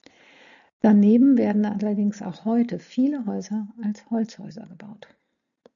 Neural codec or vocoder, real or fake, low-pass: none; real; 7.2 kHz